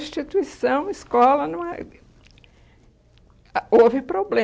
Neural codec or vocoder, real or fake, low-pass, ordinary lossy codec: none; real; none; none